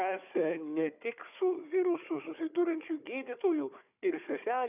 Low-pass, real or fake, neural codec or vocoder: 3.6 kHz; fake; codec, 16 kHz, 4 kbps, FunCodec, trained on Chinese and English, 50 frames a second